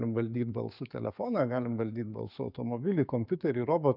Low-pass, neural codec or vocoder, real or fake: 5.4 kHz; codec, 44.1 kHz, 7.8 kbps, DAC; fake